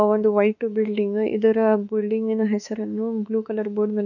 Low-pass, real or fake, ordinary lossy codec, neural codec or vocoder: 7.2 kHz; fake; none; autoencoder, 48 kHz, 32 numbers a frame, DAC-VAE, trained on Japanese speech